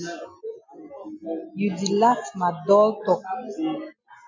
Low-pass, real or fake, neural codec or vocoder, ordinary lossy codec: 7.2 kHz; real; none; MP3, 64 kbps